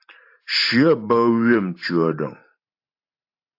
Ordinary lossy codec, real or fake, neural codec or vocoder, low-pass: AAC, 32 kbps; fake; vocoder, 44.1 kHz, 128 mel bands every 512 samples, BigVGAN v2; 5.4 kHz